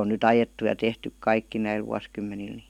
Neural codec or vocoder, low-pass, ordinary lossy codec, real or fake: none; 19.8 kHz; none; real